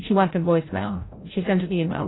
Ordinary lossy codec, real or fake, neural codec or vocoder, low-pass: AAC, 16 kbps; fake; codec, 16 kHz, 0.5 kbps, FreqCodec, larger model; 7.2 kHz